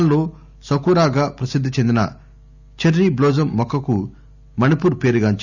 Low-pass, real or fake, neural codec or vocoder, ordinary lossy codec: 7.2 kHz; real; none; none